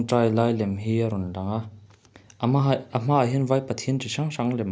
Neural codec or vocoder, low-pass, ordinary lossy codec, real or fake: none; none; none; real